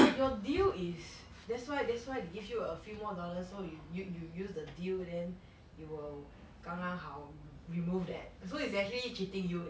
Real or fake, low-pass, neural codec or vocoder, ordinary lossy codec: real; none; none; none